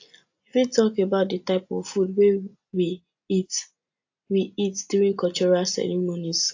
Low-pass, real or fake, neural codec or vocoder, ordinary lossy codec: 7.2 kHz; real; none; AAC, 48 kbps